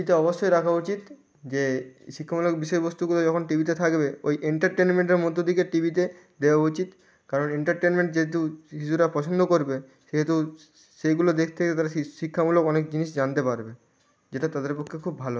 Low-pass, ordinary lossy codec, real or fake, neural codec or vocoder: none; none; real; none